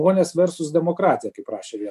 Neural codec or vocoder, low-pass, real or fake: none; 14.4 kHz; real